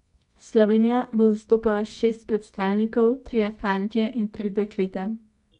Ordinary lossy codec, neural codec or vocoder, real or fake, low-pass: none; codec, 24 kHz, 0.9 kbps, WavTokenizer, medium music audio release; fake; 10.8 kHz